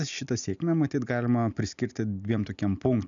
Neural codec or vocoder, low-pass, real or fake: none; 7.2 kHz; real